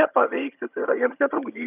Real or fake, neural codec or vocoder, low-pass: fake; vocoder, 22.05 kHz, 80 mel bands, HiFi-GAN; 3.6 kHz